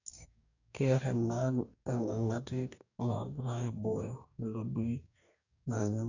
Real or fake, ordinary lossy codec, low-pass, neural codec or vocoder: fake; none; 7.2 kHz; codec, 44.1 kHz, 2.6 kbps, DAC